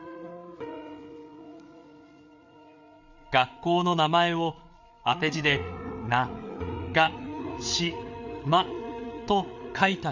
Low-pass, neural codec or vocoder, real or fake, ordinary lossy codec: 7.2 kHz; codec, 16 kHz, 8 kbps, FreqCodec, larger model; fake; none